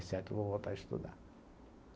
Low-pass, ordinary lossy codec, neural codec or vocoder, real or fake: none; none; none; real